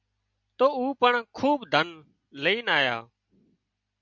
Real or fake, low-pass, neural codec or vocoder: real; 7.2 kHz; none